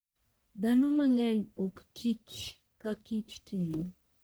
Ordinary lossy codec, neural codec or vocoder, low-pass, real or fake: none; codec, 44.1 kHz, 1.7 kbps, Pupu-Codec; none; fake